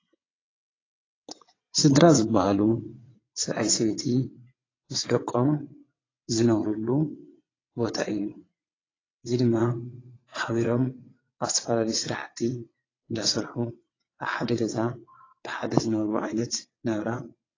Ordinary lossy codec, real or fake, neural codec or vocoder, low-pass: AAC, 32 kbps; fake; vocoder, 22.05 kHz, 80 mel bands, WaveNeXt; 7.2 kHz